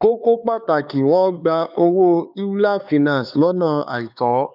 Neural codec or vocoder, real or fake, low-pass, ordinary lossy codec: codec, 16 kHz, 4 kbps, X-Codec, HuBERT features, trained on LibriSpeech; fake; 5.4 kHz; none